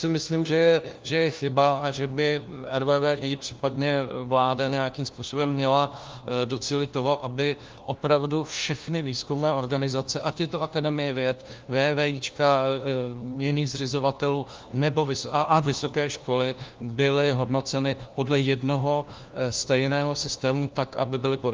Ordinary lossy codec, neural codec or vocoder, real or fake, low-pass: Opus, 24 kbps; codec, 16 kHz, 1 kbps, FunCodec, trained on LibriTTS, 50 frames a second; fake; 7.2 kHz